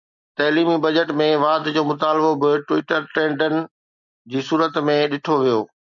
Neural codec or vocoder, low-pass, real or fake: none; 7.2 kHz; real